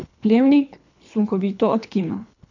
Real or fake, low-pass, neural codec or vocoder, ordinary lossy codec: fake; 7.2 kHz; codec, 24 kHz, 3 kbps, HILCodec; none